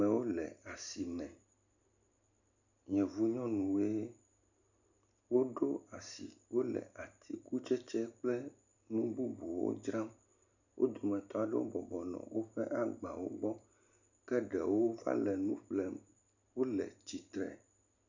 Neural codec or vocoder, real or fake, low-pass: none; real; 7.2 kHz